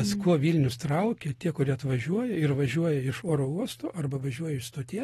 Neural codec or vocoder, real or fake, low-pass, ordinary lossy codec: none; real; 19.8 kHz; AAC, 32 kbps